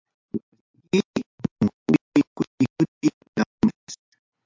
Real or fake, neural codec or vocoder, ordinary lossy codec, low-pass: real; none; MP3, 64 kbps; 7.2 kHz